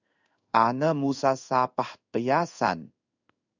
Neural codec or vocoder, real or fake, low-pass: codec, 16 kHz in and 24 kHz out, 1 kbps, XY-Tokenizer; fake; 7.2 kHz